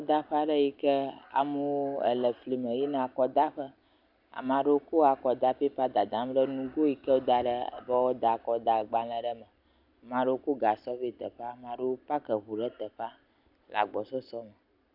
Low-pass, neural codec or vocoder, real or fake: 5.4 kHz; none; real